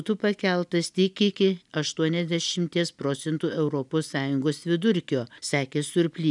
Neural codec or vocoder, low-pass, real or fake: none; 10.8 kHz; real